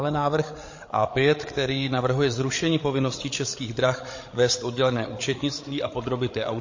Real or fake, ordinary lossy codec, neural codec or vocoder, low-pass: fake; MP3, 32 kbps; codec, 16 kHz, 16 kbps, FreqCodec, larger model; 7.2 kHz